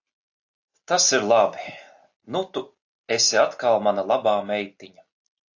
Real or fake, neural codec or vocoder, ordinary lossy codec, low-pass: real; none; AAC, 48 kbps; 7.2 kHz